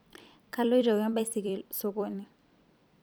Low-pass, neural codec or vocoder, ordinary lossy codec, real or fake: none; vocoder, 44.1 kHz, 128 mel bands every 256 samples, BigVGAN v2; none; fake